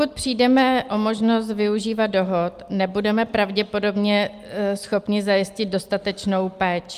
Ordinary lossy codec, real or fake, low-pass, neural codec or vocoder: Opus, 32 kbps; real; 14.4 kHz; none